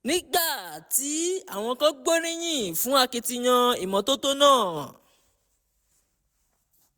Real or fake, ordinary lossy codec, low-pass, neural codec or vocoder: real; none; none; none